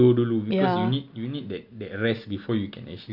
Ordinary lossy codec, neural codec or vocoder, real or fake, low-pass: none; none; real; 5.4 kHz